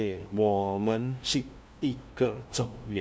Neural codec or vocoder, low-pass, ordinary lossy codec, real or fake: codec, 16 kHz, 0.5 kbps, FunCodec, trained on LibriTTS, 25 frames a second; none; none; fake